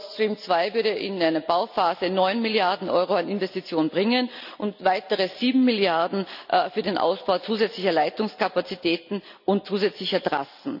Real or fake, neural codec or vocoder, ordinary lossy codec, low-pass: real; none; none; 5.4 kHz